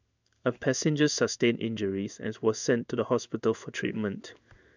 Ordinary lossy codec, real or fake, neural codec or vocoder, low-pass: none; fake; codec, 16 kHz in and 24 kHz out, 1 kbps, XY-Tokenizer; 7.2 kHz